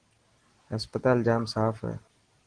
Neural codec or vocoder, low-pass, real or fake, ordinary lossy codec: none; 9.9 kHz; real; Opus, 16 kbps